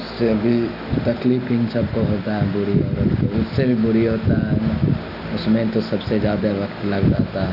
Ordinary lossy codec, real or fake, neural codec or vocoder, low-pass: none; real; none; 5.4 kHz